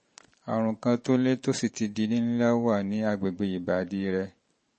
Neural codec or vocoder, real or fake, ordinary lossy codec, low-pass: vocoder, 44.1 kHz, 128 mel bands every 512 samples, BigVGAN v2; fake; MP3, 32 kbps; 10.8 kHz